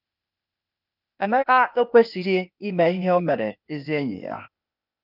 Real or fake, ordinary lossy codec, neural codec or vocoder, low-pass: fake; none; codec, 16 kHz, 0.8 kbps, ZipCodec; 5.4 kHz